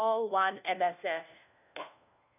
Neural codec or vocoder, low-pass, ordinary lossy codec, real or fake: codec, 16 kHz, 1 kbps, FunCodec, trained on LibriTTS, 50 frames a second; 3.6 kHz; none; fake